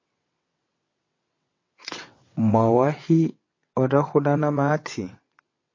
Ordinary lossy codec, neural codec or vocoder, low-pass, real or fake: MP3, 32 kbps; vocoder, 22.05 kHz, 80 mel bands, WaveNeXt; 7.2 kHz; fake